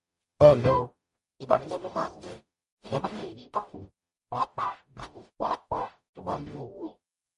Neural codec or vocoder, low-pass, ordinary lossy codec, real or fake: codec, 44.1 kHz, 0.9 kbps, DAC; 14.4 kHz; MP3, 48 kbps; fake